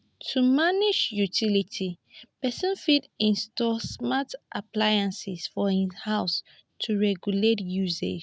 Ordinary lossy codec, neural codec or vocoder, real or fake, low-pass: none; none; real; none